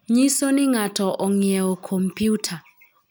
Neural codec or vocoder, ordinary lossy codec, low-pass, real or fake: none; none; none; real